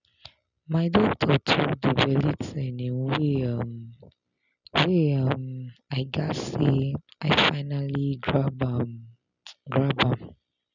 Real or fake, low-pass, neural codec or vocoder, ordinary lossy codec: real; 7.2 kHz; none; none